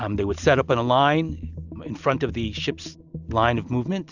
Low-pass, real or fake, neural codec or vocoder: 7.2 kHz; real; none